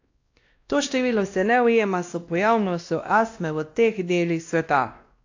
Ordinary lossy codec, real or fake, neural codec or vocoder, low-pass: AAC, 48 kbps; fake; codec, 16 kHz, 1 kbps, X-Codec, WavLM features, trained on Multilingual LibriSpeech; 7.2 kHz